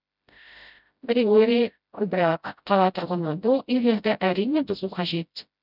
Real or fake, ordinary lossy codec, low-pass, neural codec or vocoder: fake; none; 5.4 kHz; codec, 16 kHz, 0.5 kbps, FreqCodec, smaller model